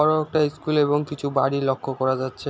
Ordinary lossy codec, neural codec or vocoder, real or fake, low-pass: none; none; real; none